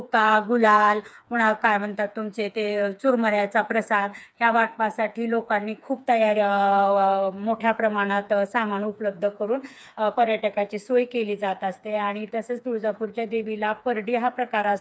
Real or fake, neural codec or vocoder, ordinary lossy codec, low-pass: fake; codec, 16 kHz, 4 kbps, FreqCodec, smaller model; none; none